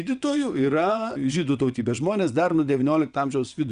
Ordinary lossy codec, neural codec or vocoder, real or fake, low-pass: Opus, 64 kbps; vocoder, 22.05 kHz, 80 mel bands, WaveNeXt; fake; 9.9 kHz